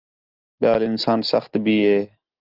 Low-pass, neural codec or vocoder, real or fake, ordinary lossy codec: 5.4 kHz; none; real; Opus, 32 kbps